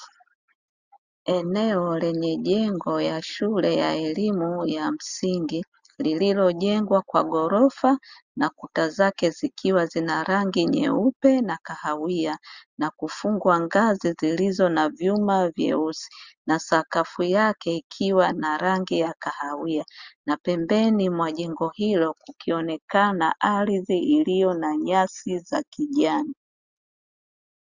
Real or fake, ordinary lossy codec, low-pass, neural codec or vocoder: real; Opus, 64 kbps; 7.2 kHz; none